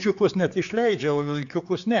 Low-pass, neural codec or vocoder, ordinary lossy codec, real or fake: 7.2 kHz; codec, 16 kHz, 4 kbps, X-Codec, HuBERT features, trained on general audio; AAC, 64 kbps; fake